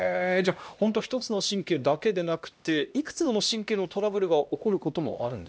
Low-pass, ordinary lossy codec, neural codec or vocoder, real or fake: none; none; codec, 16 kHz, 1 kbps, X-Codec, HuBERT features, trained on LibriSpeech; fake